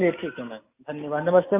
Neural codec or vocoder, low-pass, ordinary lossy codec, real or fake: none; 3.6 kHz; MP3, 32 kbps; real